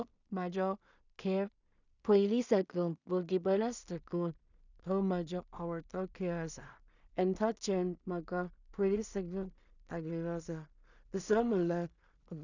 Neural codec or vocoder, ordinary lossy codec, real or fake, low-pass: codec, 16 kHz in and 24 kHz out, 0.4 kbps, LongCat-Audio-Codec, two codebook decoder; none; fake; 7.2 kHz